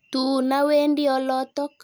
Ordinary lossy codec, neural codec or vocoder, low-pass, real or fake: none; none; none; real